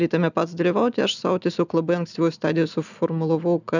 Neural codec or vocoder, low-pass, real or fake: none; 7.2 kHz; real